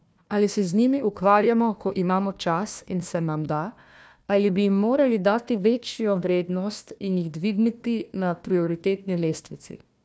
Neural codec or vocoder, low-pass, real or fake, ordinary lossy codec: codec, 16 kHz, 1 kbps, FunCodec, trained on Chinese and English, 50 frames a second; none; fake; none